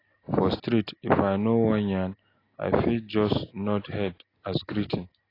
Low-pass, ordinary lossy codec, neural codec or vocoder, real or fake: 5.4 kHz; AAC, 24 kbps; none; real